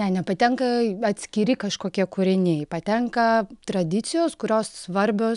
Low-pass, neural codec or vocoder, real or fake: 10.8 kHz; none; real